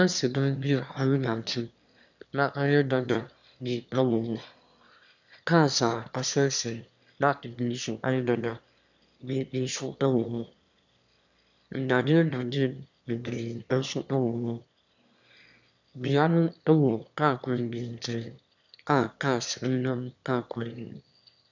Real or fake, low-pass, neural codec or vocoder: fake; 7.2 kHz; autoencoder, 22.05 kHz, a latent of 192 numbers a frame, VITS, trained on one speaker